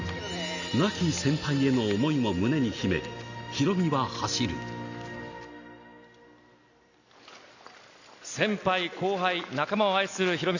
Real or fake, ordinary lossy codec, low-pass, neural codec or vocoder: real; none; 7.2 kHz; none